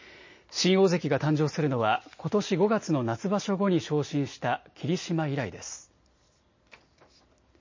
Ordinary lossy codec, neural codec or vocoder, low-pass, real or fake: MP3, 32 kbps; none; 7.2 kHz; real